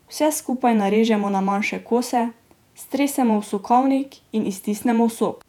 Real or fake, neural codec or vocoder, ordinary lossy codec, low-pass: fake; vocoder, 48 kHz, 128 mel bands, Vocos; none; 19.8 kHz